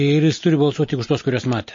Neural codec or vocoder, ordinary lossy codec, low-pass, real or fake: none; MP3, 32 kbps; 7.2 kHz; real